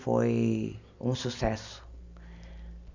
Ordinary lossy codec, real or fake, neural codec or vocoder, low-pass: none; real; none; 7.2 kHz